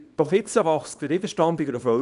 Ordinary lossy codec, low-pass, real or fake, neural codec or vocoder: none; 10.8 kHz; fake; codec, 24 kHz, 0.9 kbps, WavTokenizer, small release